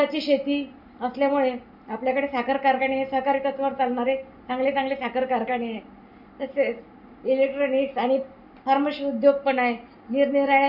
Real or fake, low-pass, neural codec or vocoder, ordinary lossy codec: real; 5.4 kHz; none; none